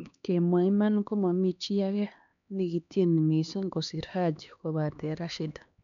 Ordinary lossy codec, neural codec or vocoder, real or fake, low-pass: none; codec, 16 kHz, 2 kbps, X-Codec, HuBERT features, trained on LibriSpeech; fake; 7.2 kHz